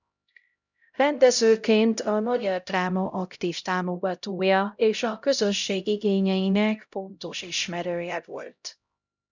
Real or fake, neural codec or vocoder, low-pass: fake; codec, 16 kHz, 0.5 kbps, X-Codec, HuBERT features, trained on LibriSpeech; 7.2 kHz